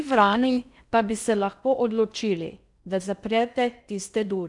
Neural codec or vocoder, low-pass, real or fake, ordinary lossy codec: codec, 16 kHz in and 24 kHz out, 0.6 kbps, FocalCodec, streaming, 4096 codes; 10.8 kHz; fake; none